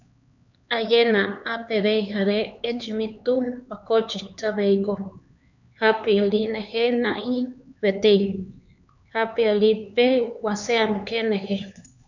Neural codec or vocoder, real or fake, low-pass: codec, 16 kHz, 4 kbps, X-Codec, HuBERT features, trained on LibriSpeech; fake; 7.2 kHz